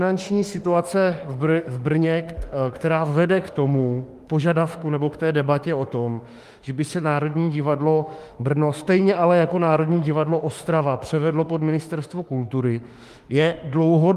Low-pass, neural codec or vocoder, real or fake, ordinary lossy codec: 14.4 kHz; autoencoder, 48 kHz, 32 numbers a frame, DAC-VAE, trained on Japanese speech; fake; Opus, 32 kbps